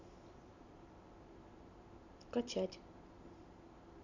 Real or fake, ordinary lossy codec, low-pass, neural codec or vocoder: real; none; 7.2 kHz; none